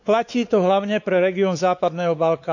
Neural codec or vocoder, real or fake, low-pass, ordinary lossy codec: codec, 16 kHz, 4 kbps, X-Codec, WavLM features, trained on Multilingual LibriSpeech; fake; 7.2 kHz; none